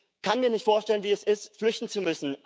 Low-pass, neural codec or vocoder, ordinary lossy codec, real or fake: none; codec, 16 kHz, 6 kbps, DAC; none; fake